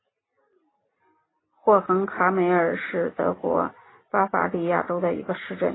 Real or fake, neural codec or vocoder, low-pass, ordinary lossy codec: real; none; 7.2 kHz; AAC, 16 kbps